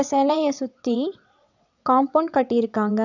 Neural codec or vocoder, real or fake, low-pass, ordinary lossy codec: vocoder, 22.05 kHz, 80 mel bands, WaveNeXt; fake; 7.2 kHz; none